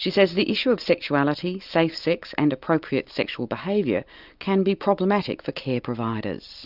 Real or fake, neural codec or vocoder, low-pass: real; none; 5.4 kHz